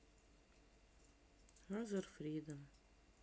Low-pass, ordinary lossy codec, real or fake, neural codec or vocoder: none; none; real; none